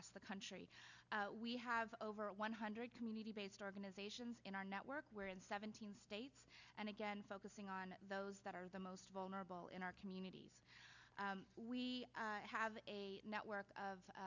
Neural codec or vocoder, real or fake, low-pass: none; real; 7.2 kHz